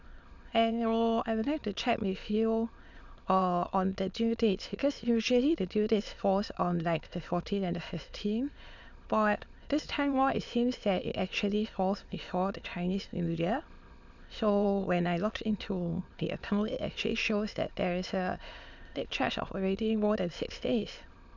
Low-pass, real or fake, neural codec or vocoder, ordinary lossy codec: 7.2 kHz; fake; autoencoder, 22.05 kHz, a latent of 192 numbers a frame, VITS, trained on many speakers; none